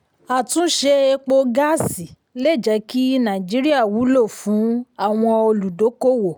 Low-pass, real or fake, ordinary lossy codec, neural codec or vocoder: none; real; none; none